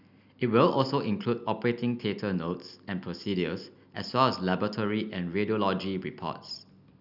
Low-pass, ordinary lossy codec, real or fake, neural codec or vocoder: 5.4 kHz; none; real; none